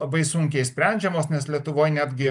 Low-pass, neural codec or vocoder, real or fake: 10.8 kHz; none; real